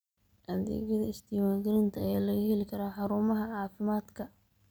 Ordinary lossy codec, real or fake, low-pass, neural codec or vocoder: none; real; none; none